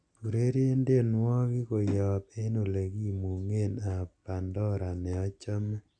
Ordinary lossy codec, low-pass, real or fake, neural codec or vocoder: none; 9.9 kHz; real; none